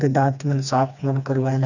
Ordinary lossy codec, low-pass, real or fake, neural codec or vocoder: none; 7.2 kHz; fake; codec, 16 kHz, 2 kbps, FreqCodec, smaller model